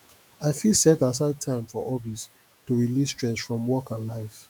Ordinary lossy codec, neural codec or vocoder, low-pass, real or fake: none; autoencoder, 48 kHz, 128 numbers a frame, DAC-VAE, trained on Japanese speech; none; fake